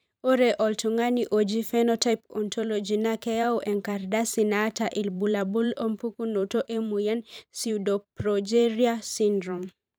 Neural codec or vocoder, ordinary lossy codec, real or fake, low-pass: vocoder, 44.1 kHz, 128 mel bands every 512 samples, BigVGAN v2; none; fake; none